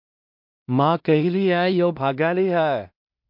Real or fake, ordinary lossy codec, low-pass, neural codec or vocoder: fake; MP3, 48 kbps; 5.4 kHz; codec, 16 kHz in and 24 kHz out, 0.4 kbps, LongCat-Audio-Codec, two codebook decoder